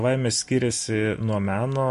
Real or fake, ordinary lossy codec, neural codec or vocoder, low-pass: real; MP3, 48 kbps; none; 14.4 kHz